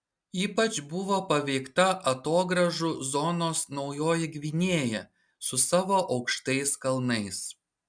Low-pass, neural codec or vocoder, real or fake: 9.9 kHz; none; real